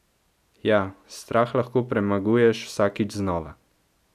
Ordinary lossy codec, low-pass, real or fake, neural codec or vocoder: none; 14.4 kHz; real; none